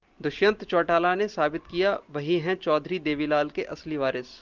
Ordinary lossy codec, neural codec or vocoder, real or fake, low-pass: Opus, 24 kbps; none; real; 7.2 kHz